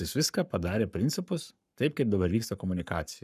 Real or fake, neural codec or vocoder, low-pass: fake; codec, 44.1 kHz, 7.8 kbps, Pupu-Codec; 14.4 kHz